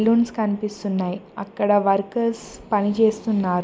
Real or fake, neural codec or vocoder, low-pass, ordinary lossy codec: real; none; none; none